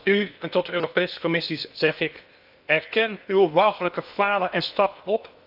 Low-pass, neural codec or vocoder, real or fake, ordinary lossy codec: 5.4 kHz; codec, 16 kHz in and 24 kHz out, 0.8 kbps, FocalCodec, streaming, 65536 codes; fake; none